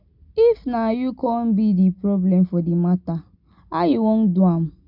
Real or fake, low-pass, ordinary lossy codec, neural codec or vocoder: real; 5.4 kHz; none; none